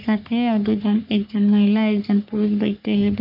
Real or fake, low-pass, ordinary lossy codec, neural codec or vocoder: fake; 5.4 kHz; none; codec, 44.1 kHz, 3.4 kbps, Pupu-Codec